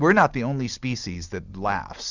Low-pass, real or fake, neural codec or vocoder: 7.2 kHz; real; none